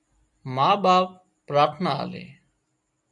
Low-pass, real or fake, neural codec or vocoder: 10.8 kHz; real; none